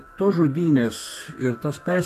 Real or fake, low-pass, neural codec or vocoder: fake; 14.4 kHz; codec, 32 kHz, 1.9 kbps, SNAC